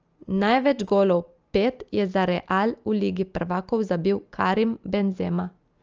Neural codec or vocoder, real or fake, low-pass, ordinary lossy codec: none; real; 7.2 kHz; Opus, 24 kbps